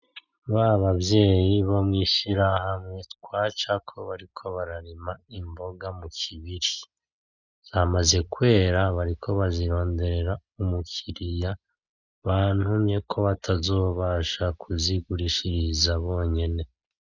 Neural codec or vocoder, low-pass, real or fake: none; 7.2 kHz; real